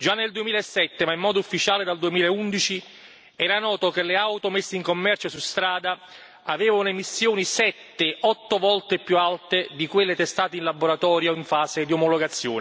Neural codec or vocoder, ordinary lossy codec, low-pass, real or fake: none; none; none; real